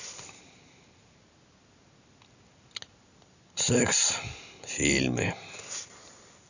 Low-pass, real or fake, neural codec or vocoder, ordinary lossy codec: 7.2 kHz; real; none; none